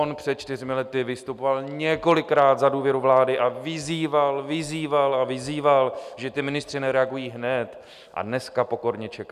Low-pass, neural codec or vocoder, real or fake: 14.4 kHz; none; real